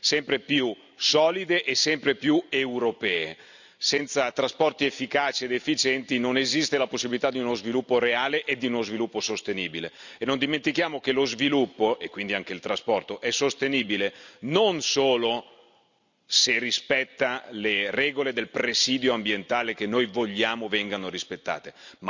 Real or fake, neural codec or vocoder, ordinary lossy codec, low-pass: real; none; none; 7.2 kHz